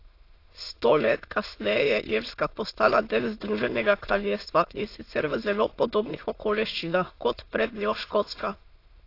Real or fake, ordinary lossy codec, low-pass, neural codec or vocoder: fake; AAC, 32 kbps; 5.4 kHz; autoencoder, 22.05 kHz, a latent of 192 numbers a frame, VITS, trained on many speakers